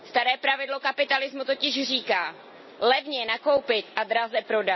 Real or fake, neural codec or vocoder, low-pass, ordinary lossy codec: real; none; 7.2 kHz; MP3, 24 kbps